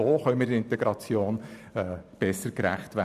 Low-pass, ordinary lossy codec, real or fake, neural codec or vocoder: 14.4 kHz; none; fake; vocoder, 44.1 kHz, 128 mel bands every 512 samples, BigVGAN v2